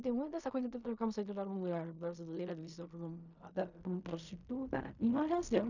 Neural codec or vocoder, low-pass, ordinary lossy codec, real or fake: codec, 16 kHz in and 24 kHz out, 0.4 kbps, LongCat-Audio-Codec, fine tuned four codebook decoder; 7.2 kHz; none; fake